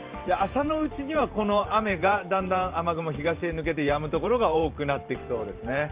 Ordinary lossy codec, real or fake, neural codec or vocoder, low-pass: Opus, 24 kbps; fake; vocoder, 44.1 kHz, 128 mel bands every 512 samples, BigVGAN v2; 3.6 kHz